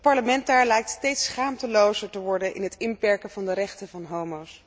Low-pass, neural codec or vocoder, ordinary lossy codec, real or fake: none; none; none; real